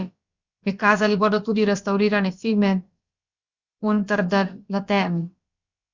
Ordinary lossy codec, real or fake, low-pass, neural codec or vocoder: Opus, 64 kbps; fake; 7.2 kHz; codec, 16 kHz, about 1 kbps, DyCAST, with the encoder's durations